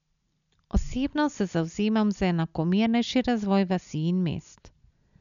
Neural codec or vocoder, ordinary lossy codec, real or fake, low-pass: none; none; real; 7.2 kHz